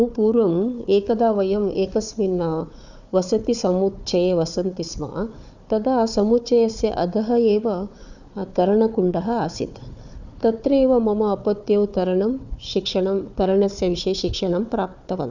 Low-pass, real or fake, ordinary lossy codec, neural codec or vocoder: 7.2 kHz; fake; none; codec, 16 kHz, 4 kbps, FunCodec, trained on Chinese and English, 50 frames a second